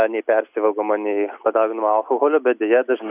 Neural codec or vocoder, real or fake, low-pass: none; real; 3.6 kHz